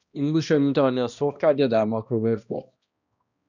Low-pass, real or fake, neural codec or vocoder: 7.2 kHz; fake; codec, 16 kHz, 1 kbps, X-Codec, HuBERT features, trained on balanced general audio